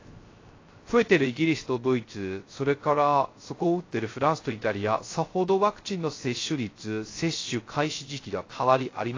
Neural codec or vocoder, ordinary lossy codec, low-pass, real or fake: codec, 16 kHz, 0.3 kbps, FocalCodec; AAC, 32 kbps; 7.2 kHz; fake